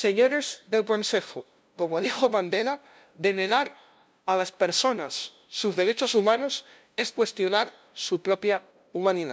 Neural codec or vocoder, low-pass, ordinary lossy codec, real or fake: codec, 16 kHz, 0.5 kbps, FunCodec, trained on LibriTTS, 25 frames a second; none; none; fake